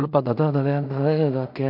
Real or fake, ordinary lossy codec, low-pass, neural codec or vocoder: fake; none; 5.4 kHz; codec, 16 kHz in and 24 kHz out, 0.4 kbps, LongCat-Audio-Codec, two codebook decoder